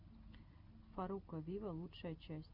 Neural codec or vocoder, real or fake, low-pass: none; real; 5.4 kHz